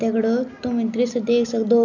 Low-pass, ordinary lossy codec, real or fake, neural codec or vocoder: 7.2 kHz; none; real; none